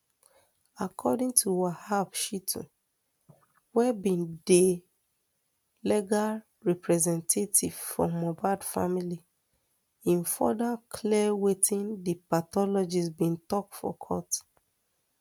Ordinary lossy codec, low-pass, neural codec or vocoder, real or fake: none; none; none; real